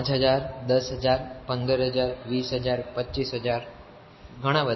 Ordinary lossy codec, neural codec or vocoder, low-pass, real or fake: MP3, 24 kbps; none; 7.2 kHz; real